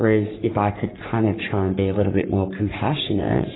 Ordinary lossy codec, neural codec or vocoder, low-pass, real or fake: AAC, 16 kbps; codec, 44.1 kHz, 3.4 kbps, Pupu-Codec; 7.2 kHz; fake